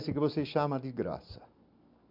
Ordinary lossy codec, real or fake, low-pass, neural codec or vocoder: MP3, 48 kbps; real; 5.4 kHz; none